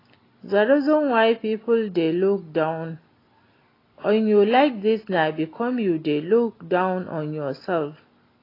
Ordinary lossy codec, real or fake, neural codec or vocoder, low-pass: AAC, 24 kbps; real; none; 5.4 kHz